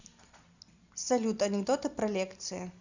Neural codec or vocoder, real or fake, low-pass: none; real; 7.2 kHz